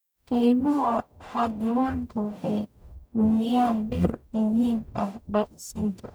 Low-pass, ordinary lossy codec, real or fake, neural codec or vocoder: none; none; fake; codec, 44.1 kHz, 0.9 kbps, DAC